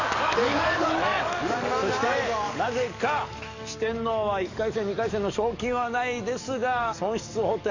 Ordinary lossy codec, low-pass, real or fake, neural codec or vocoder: none; 7.2 kHz; fake; codec, 44.1 kHz, 7.8 kbps, DAC